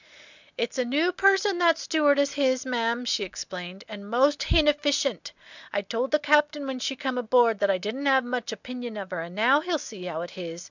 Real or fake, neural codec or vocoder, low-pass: real; none; 7.2 kHz